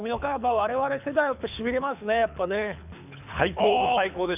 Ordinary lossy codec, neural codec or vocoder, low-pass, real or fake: none; codec, 24 kHz, 6 kbps, HILCodec; 3.6 kHz; fake